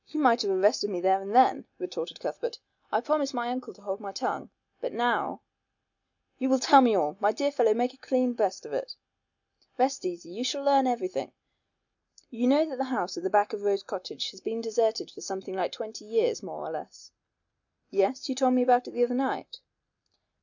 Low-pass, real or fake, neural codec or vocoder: 7.2 kHz; real; none